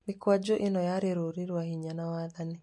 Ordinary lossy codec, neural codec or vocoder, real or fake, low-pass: MP3, 48 kbps; none; real; 10.8 kHz